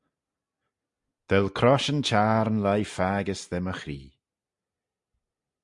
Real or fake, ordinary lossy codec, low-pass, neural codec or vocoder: real; Opus, 64 kbps; 10.8 kHz; none